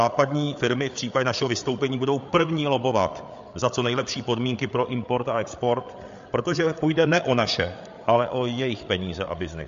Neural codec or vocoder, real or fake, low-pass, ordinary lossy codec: codec, 16 kHz, 8 kbps, FreqCodec, larger model; fake; 7.2 kHz; MP3, 48 kbps